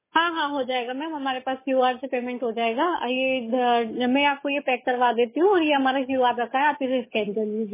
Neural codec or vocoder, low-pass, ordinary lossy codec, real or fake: codec, 44.1 kHz, 7.8 kbps, DAC; 3.6 kHz; MP3, 16 kbps; fake